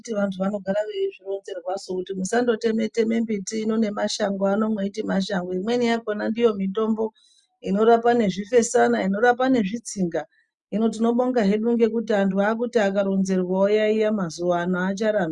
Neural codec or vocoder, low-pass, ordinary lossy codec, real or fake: none; 10.8 kHz; Opus, 64 kbps; real